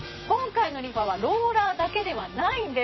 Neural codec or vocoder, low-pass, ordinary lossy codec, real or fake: vocoder, 44.1 kHz, 128 mel bands, Pupu-Vocoder; 7.2 kHz; MP3, 24 kbps; fake